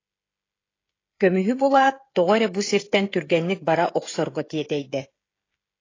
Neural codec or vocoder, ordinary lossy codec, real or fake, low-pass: codec, 16 kHz, 16 kbps, FreqCodec, smaller model; AAC, 32 kbps; fake; 7.2 kHz